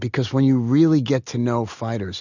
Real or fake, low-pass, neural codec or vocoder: real; 7.2 kHz; none